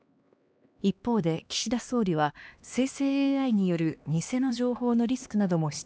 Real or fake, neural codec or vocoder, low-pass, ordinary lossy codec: fake; codec, 16 kHz, 2 kbps, X-Codec, HuBERT features, trained on LibriSpeech; none; none